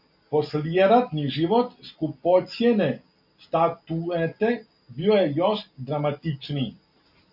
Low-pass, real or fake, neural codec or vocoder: 5.4 kHz; real; none